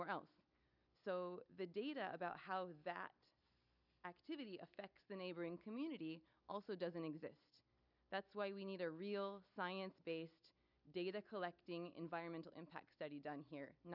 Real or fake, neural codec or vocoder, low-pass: real; none; 5.4 kHz